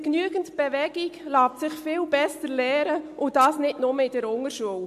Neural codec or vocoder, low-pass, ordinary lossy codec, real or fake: none; 14.4 kHz; MP3, 64 kbps; real